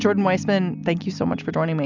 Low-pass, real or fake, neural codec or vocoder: 7.2 kHz; real; none